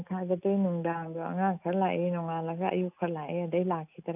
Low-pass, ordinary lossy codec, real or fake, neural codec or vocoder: 3.6 kHz; none; real; none